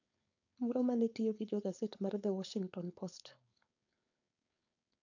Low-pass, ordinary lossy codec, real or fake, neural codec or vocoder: 7.2 kHz; none; fake; codec, 16 kHz, 4.8 kbps, FACodec